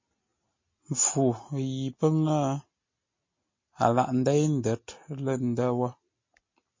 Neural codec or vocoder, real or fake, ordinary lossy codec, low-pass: none; real; MP3, 32 kbps; 7.2 kHz